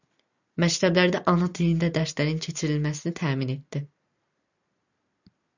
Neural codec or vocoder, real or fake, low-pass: none; real; 7.2 kHz